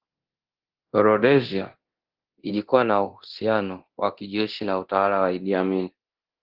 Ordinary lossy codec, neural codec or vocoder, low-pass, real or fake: Opus, 16 kbps; codec, 24 kHz, 0.9 kbps, DualCodec; 5.4 kHz; fake